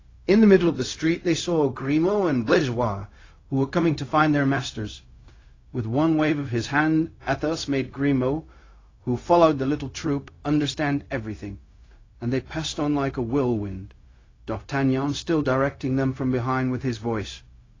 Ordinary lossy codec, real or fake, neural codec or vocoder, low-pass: AAC, 32 kbps; fake; codec, 16 kHz, 0.4 kbps, LongCat-Audio-Codec; 7.2 kHz